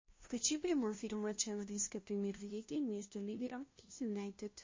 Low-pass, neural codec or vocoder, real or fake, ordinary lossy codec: 7.2 kHz; codec, 16 kHz, 0.5 kbps, FunCodec, trained on LibriTTS, 25 frames a second; fake; MP3, 32 kbps